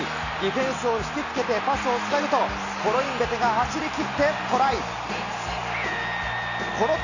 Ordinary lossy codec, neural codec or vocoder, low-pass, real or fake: none; none; 7.2 kHz; real